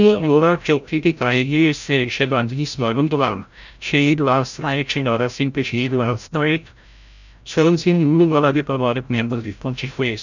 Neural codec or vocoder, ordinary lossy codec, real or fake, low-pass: codec, 16 kHz, 0.5 kbps, FreqCodec, larger model; none; fake; 7.2 kHz